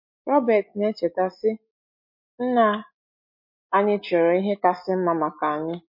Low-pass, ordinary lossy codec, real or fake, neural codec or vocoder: 5.4 kHz; MP3, 32 kbps; real; none